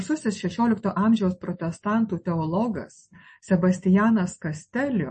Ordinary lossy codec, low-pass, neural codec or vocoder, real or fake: MP3, 32 kbps; 10.8 kHz; none; real